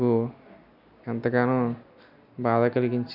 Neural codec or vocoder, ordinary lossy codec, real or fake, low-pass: none; none; real; 5.4 kHz